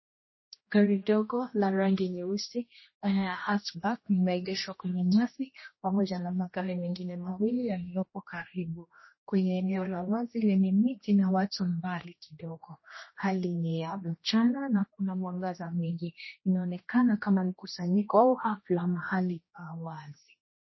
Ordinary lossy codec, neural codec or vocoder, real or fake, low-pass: MP3, 24 kbps; codec, 16 kHz, 1 kbps, X-Codec, HuBERT features, trained on general audio; fake; 7.2 kHz